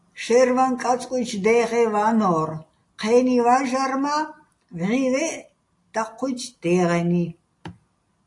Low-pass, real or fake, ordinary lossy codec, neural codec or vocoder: 10.8 kHz; real; AAC, 48 kbps; none